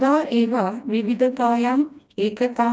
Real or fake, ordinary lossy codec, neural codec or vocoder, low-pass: fake; none; codec, 16 kHz, 1 kbps, FreqCodec, smaller model; none